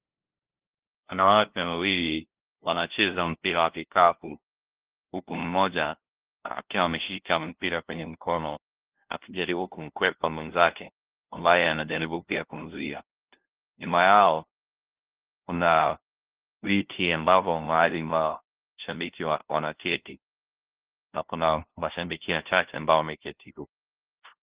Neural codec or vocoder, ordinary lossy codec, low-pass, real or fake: codec, 16 kHz, 0.5 kbps, FunCodec, trained on LibriTTS, 25 frames a second; Opus, 16 kbps; 3.6 kHz; fake